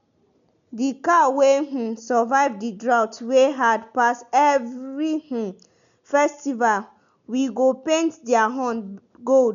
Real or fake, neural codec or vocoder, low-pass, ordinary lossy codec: real; none; 7.2 kHz; none